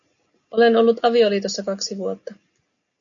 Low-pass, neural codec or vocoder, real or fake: 7.2 kHz; none; real